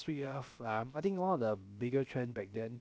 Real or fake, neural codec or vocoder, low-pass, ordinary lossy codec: fake; codec, 16 kHz, about 1 kbps, DyCAST, with the encoder's durations; none; none